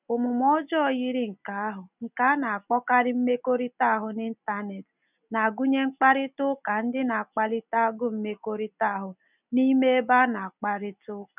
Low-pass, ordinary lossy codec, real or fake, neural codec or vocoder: 3.6 kHz; none; real; none